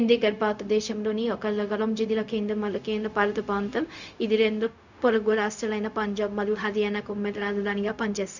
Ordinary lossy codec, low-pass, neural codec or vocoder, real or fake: none; 7.2 kHz; codec, 16 kHz, 0.4 kbps, LongCat-Audio-Codec; fake